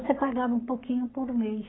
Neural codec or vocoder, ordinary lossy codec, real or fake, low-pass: codec, 44.1 kHz, 7.8 kbps, Pupu-Codec; AAC, 16 kbps; fake; 7.2 kHz